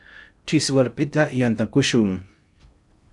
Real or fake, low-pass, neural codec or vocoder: fake; 10.8 kHz; codec, 16 kHz in and 24 kHz out, 0.6 kbps, FocalCodec, streaming, 2048 codes